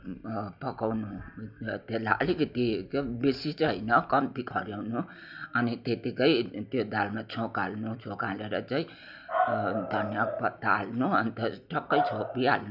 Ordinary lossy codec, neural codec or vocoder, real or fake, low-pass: none; none; real; 5.4 kHz